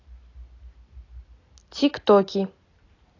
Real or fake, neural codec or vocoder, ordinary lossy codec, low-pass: real; none; AAC, 32 kbps; 7.2 kHz